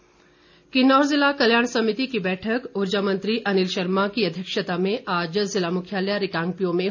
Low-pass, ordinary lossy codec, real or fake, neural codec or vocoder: 7.2 kHz; none; real; none